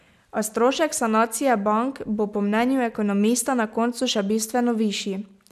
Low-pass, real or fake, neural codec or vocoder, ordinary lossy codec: 14.4 kHz; real; none; none